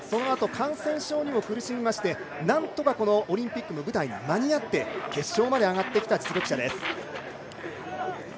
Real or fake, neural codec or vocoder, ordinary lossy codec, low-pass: real; none; none; none